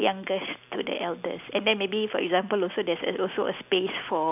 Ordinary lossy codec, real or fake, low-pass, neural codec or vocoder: none; real; 3.6 kHz; none